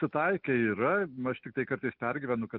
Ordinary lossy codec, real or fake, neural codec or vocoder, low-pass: Opus, 24 kbps; real; none; 5.4 kHz